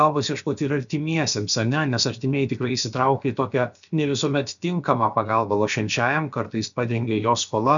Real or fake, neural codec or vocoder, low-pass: fake; codec, 16 kHz, about 1 kbps, DyCAST, with the encoder's durations; 7.2 kHz